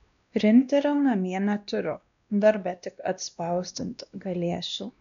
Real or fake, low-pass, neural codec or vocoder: fake; 7.2 kHz; codec, 16 kHz, 1 kbps, X-Codec, WavLM features, trained on Multilingual LibriSpeech